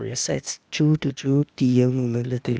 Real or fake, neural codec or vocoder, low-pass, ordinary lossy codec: fake; codec, 16 kHz, 0.8 kbps, ZipCodec; none; none